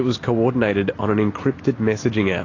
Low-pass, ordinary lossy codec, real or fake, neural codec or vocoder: 7.2 kHz; AAC, 32 kbps; real; none